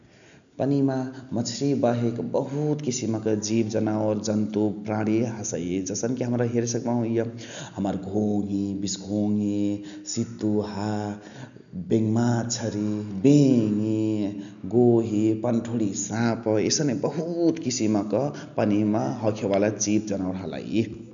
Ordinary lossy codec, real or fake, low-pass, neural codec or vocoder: none; real; 7.2 kHz; none